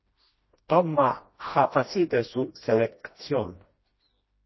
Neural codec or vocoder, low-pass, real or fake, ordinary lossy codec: codec, 16 kHz, 1 kbps, FreqCodec, smaller model; 7.2 kHz; fake; MP3, 24 kbps